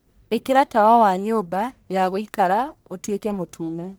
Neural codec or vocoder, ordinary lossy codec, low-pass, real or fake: codec, 44.1 kHz, 1.7 kbps, Pupu-Codec; none; none; fake